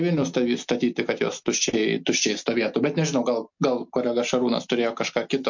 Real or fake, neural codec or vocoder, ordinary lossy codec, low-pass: real; none; MP3, 48 kbps; 7.2 kHz